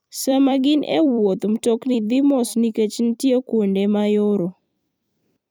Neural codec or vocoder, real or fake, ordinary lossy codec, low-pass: vocoder, 44.1 kHz, 128 mel bands every 256 samples, BigVGAN v2; fake; none; none